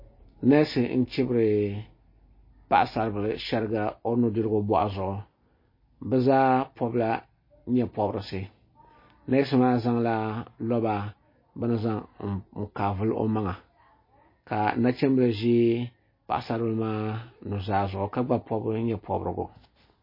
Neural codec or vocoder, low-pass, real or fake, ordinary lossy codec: none; 5.4 kHz; real; MP3, 24 kbps